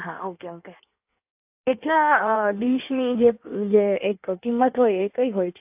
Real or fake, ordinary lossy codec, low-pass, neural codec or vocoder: fake; AAC, 32 kbps; 3.6 kHz; codec, 16 kHz in and 24 kHz out, 1.1 kbps, FireRedTTS-2 codec